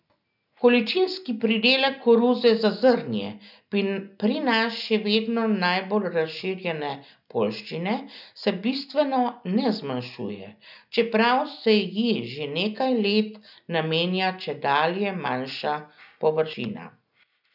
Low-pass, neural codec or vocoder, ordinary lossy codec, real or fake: 5.4 kHz; none; none; real